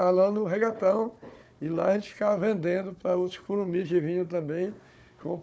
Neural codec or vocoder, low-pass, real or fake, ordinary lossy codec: codec, 16 kHz, 4 kbps, FunCodec, trained on Chinese and English, 50 frames a second; none; fake; none